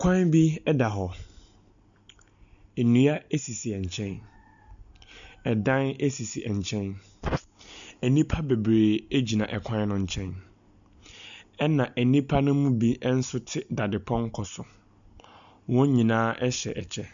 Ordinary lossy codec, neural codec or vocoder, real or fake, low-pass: MP3, 96 kbps; none; real; 7.2 kHz